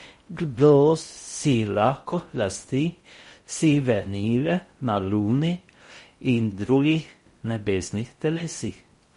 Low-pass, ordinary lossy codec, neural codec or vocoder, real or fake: 10.8 kHz; MP3, 48 kbps; codec, 16 kHz in and 24 kHz out, 0.6 kbps, FocalCodec, streaming, 4096 codes; fake